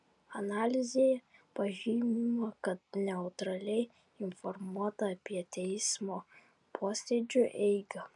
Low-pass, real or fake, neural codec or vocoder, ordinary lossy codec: 10.8 kHz; real; none; MP3, 96 kbps